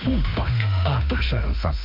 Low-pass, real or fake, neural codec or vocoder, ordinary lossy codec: 5.4 kHz; fake; autoencoder, 48 kHz, 32 numbers a frame, DAC-VAE, trained on Japanese speech; MP3, 48 kbps